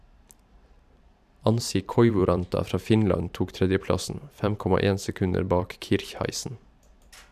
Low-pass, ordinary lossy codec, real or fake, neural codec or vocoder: 14.4 kHz; none; fake; vocoder, 44.1 kHz, 128 mel bands every 512 samples, BigVGAN v2